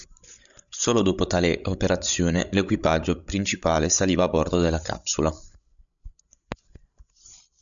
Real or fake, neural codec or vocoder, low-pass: fake; codec, 16 kHz, 16 kbps, FreqCodec, larger model; 7.2 kHz